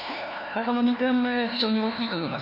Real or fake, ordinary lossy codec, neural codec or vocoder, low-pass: fake; none; codec, 16 kHz, 1 kbps, FunCodec, trained on LibriTTS, 50 frames a second; 5.4 kHz